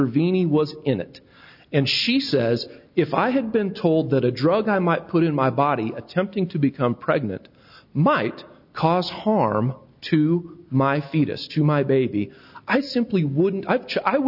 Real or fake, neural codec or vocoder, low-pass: real; none; 5.4 kHz